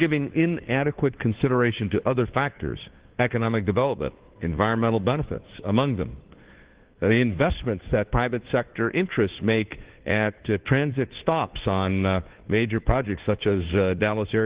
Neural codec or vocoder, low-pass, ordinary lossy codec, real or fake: codec, 16 kHz, 2 kbps, FunCodec, trained on Chinese and English, 25 frames a second; 3.6 kHz; Opus, 16 kbps; fake